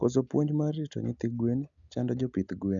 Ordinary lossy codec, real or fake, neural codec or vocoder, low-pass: none; real; none; 7.2 kHz